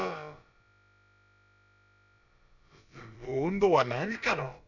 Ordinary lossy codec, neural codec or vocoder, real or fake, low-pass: none; codec, 16 kHz, about 1 kbps, DyCAST, with the encoder's durations; fake; 7.2 kHz